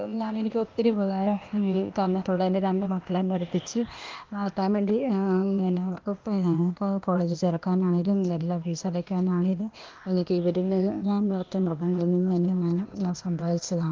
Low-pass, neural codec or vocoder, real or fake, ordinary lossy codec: 7.2 kHz; codec, 16 kHz, 0.8 kbps, ZipCodec; fake; Opus, 24 kbps